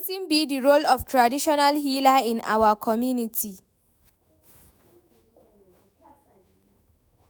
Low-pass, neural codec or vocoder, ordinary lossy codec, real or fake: none; autoencoder, 48 kHz, 128 numbers a frame, DAC-VAE, trained on Japanese speech; none; fake